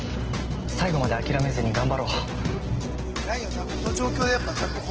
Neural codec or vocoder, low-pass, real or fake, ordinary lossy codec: none; 7.2 kHz; real; Opus, 16 kbps